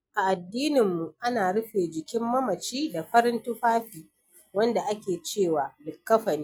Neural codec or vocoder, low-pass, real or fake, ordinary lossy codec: none; none; real; none